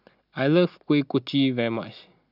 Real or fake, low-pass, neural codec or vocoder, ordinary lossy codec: real; 5.4 kHz; none; none